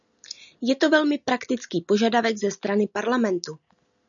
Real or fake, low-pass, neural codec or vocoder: real; 7.2 kHz; none